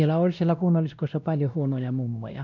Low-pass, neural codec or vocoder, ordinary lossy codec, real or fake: 7.2 kHz; codec, 16 kHz, 1 kbps, X-Codec, WavLM features, trained on Multilingual LibriSpeech; none; fake